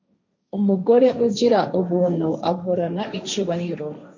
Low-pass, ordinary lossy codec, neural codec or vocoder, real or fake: 7.2 kHz; MP3, 48 kbps; codec, 16 kHz, 1.1 kbps, Voila-Tokenizer; fake